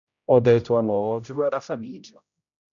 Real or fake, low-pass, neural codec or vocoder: fake; 7.2 kHz; codec, 16 kHz, 0.5 kbps, X-Codec, HuBERT features, trained on general audio